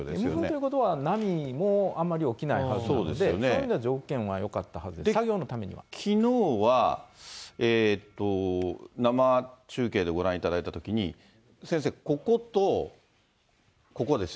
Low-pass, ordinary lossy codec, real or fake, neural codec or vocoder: none; none; real; none